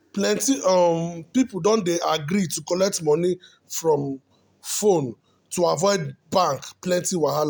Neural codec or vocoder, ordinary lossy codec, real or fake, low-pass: none; none; real; none